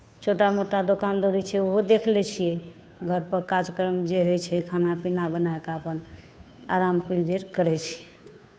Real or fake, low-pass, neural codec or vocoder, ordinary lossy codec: fake; none; codec, 16 kHz, 2 kbps, FunCodec, trained on Chinese and English, 25 frames a second; none